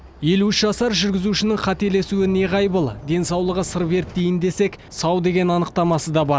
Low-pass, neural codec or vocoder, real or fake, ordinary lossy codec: none; none; real; none